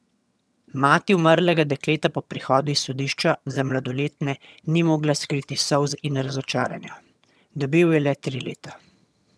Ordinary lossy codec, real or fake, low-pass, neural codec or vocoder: none; fake; none; vocoder, 22.05 kHz, 80 mel bands, HiFi-GAN